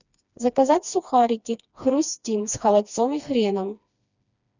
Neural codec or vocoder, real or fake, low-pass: codec, 16 kHz, 2 kbps, FreqCodec, smaller model; fake; 7.2 kHz